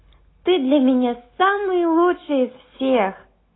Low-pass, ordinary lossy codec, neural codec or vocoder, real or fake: 7.2 kHz; AAC, 16 kbps; none; real